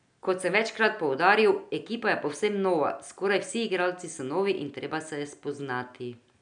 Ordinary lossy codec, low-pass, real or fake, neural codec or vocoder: none; 9.9 kHz; real; none